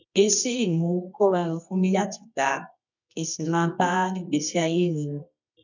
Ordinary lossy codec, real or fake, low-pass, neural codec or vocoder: none; fake; 7.2 kHz; codec, 24 kHz, 0.9 kbps, WavTokenizer, medium music audio release